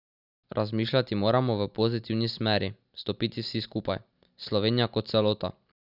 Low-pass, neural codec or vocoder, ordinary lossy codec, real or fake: 5.4 kHz; none; none; real